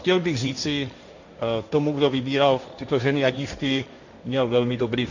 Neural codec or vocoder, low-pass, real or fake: codec, 16 kHz, 1.1 kbps, Voila-Tokenizer; 7.2 kHz; fake